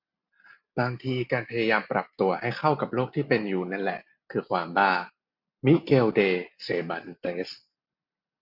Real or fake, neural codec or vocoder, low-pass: real; none; 5.4 kHz